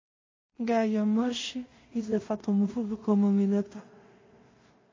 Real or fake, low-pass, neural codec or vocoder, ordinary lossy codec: fake; 7.2 kHz; codec, 16 kHz in and 24 kHz out, 0.4 kbps, LongCat-Audio-Codec, two codebook decoder; MP3, 32 kbps